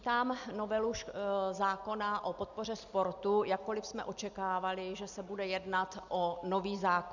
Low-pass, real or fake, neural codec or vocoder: 7.2 kHz; real; none